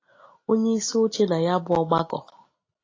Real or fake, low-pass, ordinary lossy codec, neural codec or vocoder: real; 7.2 kHz; AAC, 32 kbps; none